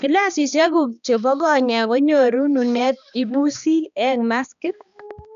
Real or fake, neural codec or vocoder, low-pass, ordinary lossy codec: fake; codec, 16 kHz, 2 kbps, X-Codec, HuBERT features, trained on balanced general audio; 7.2 kHz; none